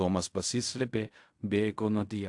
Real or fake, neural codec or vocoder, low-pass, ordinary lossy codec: fake; codec, 16 kHz in and 24 kHz out, 0.4 kbps, LongCat-Audio-Codec, fine tuned four codebook decoder; 10.8 kHz; AAC, 64 kbps